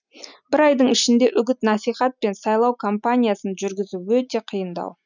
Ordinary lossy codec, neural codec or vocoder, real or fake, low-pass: none; none; real; 7.2 kHz